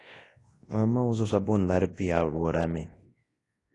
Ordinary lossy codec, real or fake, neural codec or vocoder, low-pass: AAC, 32 kbps; fake; codec, 24 kHz, 0.9 kbps, WavTokenizer, large speech release; 10.8 kHz